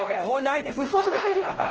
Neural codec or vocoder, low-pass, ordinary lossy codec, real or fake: codec, 16 kHz, 1 kbps, X-Codec, WavLM features, trained on Multilingual LibriSpeech; 7.2 kHz; Opus, 16 kbps; fake